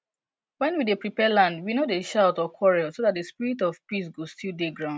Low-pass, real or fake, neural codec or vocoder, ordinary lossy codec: none; real; none; none